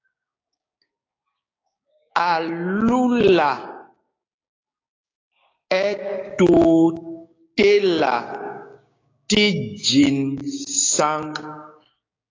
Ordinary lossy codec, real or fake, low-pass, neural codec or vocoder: AAC, 32 kbps; fake; 7.2 kHz; codec, 16 kHz, 6 kbps, DAC